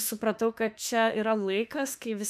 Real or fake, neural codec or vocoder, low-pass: fake; autoencoder, 48 kHz, 32 numbers a frame, DAC-VAE, trained on Japanese speech; 14.4 kHz